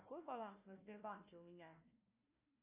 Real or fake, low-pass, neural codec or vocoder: fake; 3.6 kHz; codec, 16 kHz, 1 kbps, FunCodec, trained on Chinese and English, 50 frames a second